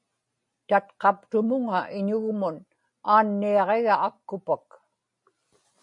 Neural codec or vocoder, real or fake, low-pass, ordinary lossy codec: none; real; 10.8 kHz; MP3, 48 kbps